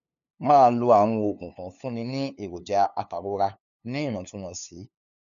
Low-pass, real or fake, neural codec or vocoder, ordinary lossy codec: 7.2 kHz; fake; codec, 16 kHz, 2 kbps, FunCodec, trained on LibriTTS, 25 frames a second; none